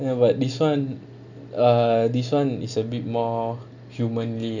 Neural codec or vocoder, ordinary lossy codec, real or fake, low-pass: none; none; real; 7.2 kHz